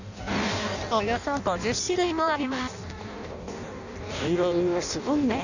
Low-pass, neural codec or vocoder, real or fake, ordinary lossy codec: 7.2 kHz; codec, 16 kHz in and 24 kHz out, 0.6 kbps, FireRedTTS-2 codec; fake; none